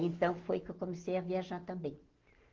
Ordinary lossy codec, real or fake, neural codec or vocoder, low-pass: Opus, 16 kbps; real; none; 7.2 kHz